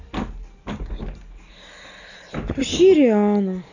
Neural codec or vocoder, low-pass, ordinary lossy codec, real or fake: none; 7.2 kHz; none; real